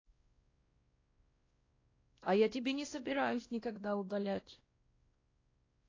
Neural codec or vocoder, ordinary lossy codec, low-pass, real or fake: codec, 16 kHz, 1 kbps, X-Codec, WavLM features, trained on Multilingual LibriSpeech; AAC, 32 kbps; 7.2 kHz; fake